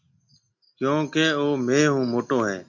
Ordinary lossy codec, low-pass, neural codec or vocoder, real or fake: MP3, 48 kbps; 7.2 kHz; none; real